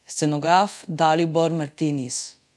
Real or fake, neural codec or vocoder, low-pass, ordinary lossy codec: fake; codec, 24 kHz, 0.5 kbps, DualCodec; none; none